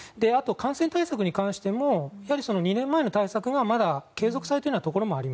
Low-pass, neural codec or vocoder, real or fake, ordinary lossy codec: none; none; real; none